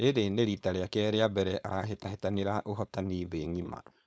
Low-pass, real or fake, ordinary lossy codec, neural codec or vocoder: none; fake; none; codec, 16 kHz, 4.8 kbps, FACodec